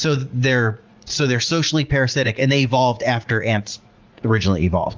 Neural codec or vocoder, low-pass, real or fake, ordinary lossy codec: vocoder, 44.1 kHz, 80 mel bands, Vocos; 7.2 kHz; fake; Opus, 32 kbps